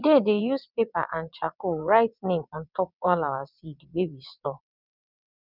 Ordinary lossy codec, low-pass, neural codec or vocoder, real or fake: none; 5.4 kHz; vocoder, 22.05 kHz, 80 mel bands, WaveNeXt; fake